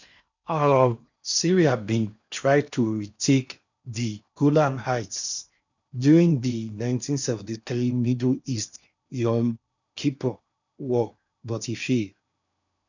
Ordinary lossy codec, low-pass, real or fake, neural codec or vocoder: none; 7.2 kHz; fake; codec, 16 kHz in and 24 kHz out, 0.8 kbps, FocalCodec, streaming, 65536 codes